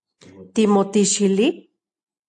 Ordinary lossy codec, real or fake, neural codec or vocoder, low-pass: AAC, 64 kbps; real; none; 10.8 kHz